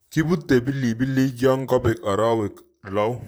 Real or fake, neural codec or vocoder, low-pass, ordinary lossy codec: fake; vocoder, 44.1 kHz, 128 mel bands, Pupu-Vocoder; none; none